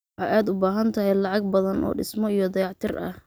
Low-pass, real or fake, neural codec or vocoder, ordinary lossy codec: none; real; none; none